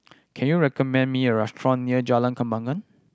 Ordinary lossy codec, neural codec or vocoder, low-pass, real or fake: none; none; none; real